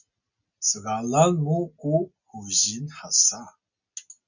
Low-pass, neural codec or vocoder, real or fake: 7.2 kHz; none; real